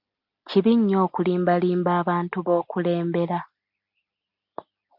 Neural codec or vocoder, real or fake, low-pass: none; real; 5.4 kHz